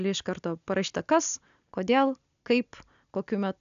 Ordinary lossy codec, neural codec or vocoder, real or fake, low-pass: AAC, 96 kbps; none; real; 7.2 kHz